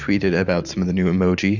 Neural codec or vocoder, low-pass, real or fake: vocoder, 44.1 kHz, 128 mel bands every 512 samples, BigVGAN v2; 7.2 kHz; fake